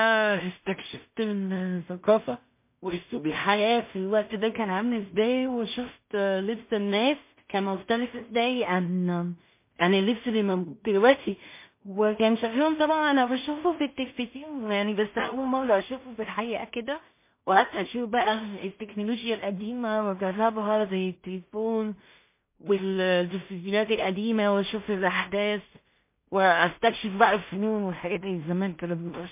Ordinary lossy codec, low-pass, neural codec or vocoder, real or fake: MP3, 24 kbps; 3.6 kHz; codec, 16 kHz in and 24 kHz out, 0.4 kbps, LongCat-Audio-Codec, two codebook decoder; fake